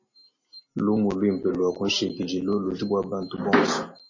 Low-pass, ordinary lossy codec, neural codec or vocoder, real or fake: 7.2 kHz; MP3, 32 kbps; none; real